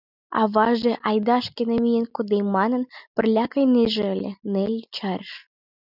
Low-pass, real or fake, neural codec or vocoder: 5.4 kHz; real; none